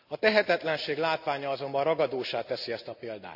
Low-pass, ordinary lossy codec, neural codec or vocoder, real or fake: 5.4 kHz; AAC, 48 kbps; none; real